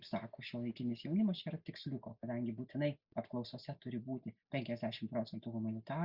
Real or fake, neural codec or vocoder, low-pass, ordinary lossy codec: real; none; 5.4 kHz; Opus, 64 kbps